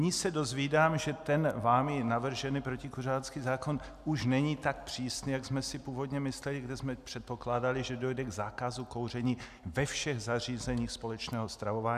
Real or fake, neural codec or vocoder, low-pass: real; none; 14.4 kHz